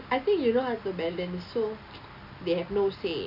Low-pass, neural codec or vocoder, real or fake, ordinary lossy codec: 5.4 kHz; none; real; none